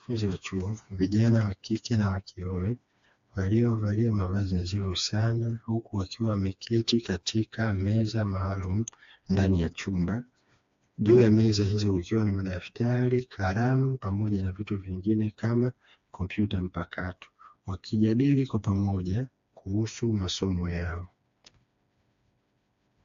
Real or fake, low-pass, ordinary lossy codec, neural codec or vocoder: fake; 7.2 kHz; AAC, 64 kbps; codec, 16 kHz, 2 kbps, FreqCodec, smaller model